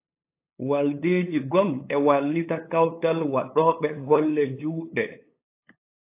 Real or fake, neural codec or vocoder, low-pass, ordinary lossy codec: fake; codec, 16 kHz, 8 kbps, FunCodec, trained on LibriTTS, 25 frames a second; 3.6 kHz; AAC, 24 kbps